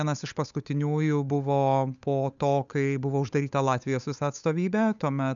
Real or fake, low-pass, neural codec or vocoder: fake; 7.2 kHz; codec, 16 kHz, 8 kbps, FunCodec, trained on Chinese and English, 25 frames a second